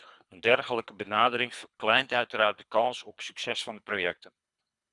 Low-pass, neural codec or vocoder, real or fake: 10.8 kHz; codec, 24 kHz, 3 kbps, HILCodec; fake